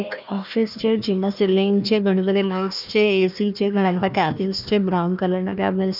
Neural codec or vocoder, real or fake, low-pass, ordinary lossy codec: codec, 16 kHz, 1 kbps, FunCodec, trained on Chinese and English, 50 frames a second; fake; 5.4 kHz; none